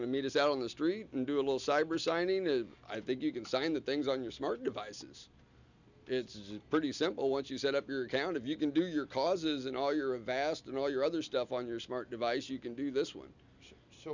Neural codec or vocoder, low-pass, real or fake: none; 7.2 kHz; real